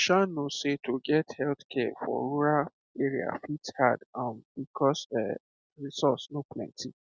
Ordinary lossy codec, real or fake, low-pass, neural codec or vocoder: none; real; none; none